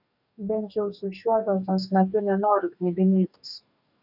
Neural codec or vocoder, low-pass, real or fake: codec, 44.1 kHz, 2.6 kbps, DAC; 5.4 kHz; fake